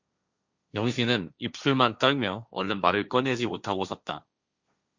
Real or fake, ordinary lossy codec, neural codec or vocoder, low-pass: fake; Opus, 64 kbps; codec, 16 kHz, 1.1 kbps, Voila-Tokenizer; 7.2 kHz